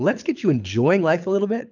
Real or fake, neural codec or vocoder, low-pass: fake; codec, 24 kHz, 6 kbps, HILCodec; 7.2 kHz